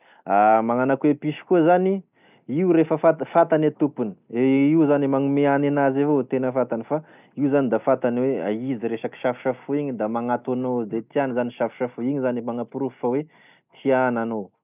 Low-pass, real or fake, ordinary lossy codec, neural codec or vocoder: 3.6 kHz; real; none; none